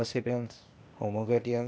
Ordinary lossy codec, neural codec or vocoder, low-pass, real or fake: none; codec, 16 kHz, 0.8 kbps, ZipCodec; none; fake